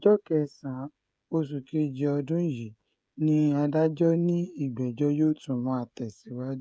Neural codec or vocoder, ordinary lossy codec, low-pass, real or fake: codec, 16 kHz, 16 kbps, FreqCodec, smaller model; none; none; fake